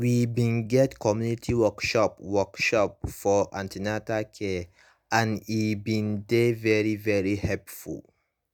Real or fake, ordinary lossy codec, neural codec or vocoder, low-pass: real; none; none; none